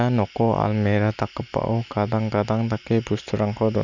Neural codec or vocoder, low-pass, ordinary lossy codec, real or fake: none; 7.2 kHz; none; real